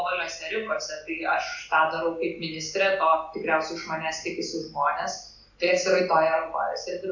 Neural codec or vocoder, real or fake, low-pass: none; real; 7.2 kHz